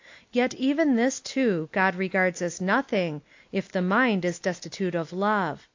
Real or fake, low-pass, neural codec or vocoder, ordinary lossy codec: real; 7.2 kHz; none; AAC, 48 kbps